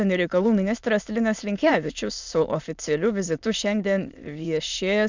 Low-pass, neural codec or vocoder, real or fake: 7.2 kHz; autoencoder, 22.05 kHz, a latent of 192 numbers a frame, VITS, trained on many speakers; fake